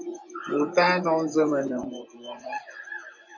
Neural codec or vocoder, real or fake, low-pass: vocoder, 24 kHz, 100 mel bands, Vocos; fake; 7.2 kHz